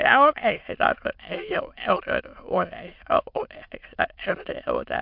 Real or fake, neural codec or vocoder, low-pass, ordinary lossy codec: fake; autoencoder, 22.05 kHz, a latent of 192 numbers a frame, VITS, trained on many speakers; 5.4 kHz; MP3, 48 kbps